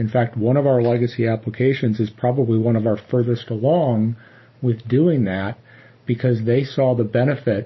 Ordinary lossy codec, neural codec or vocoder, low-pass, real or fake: MP3, 24 kbps; none; 7.2 kHz; real